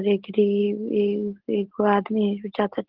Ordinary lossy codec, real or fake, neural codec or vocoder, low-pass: Opus, 16 kbps; real; none; 5.4 kHz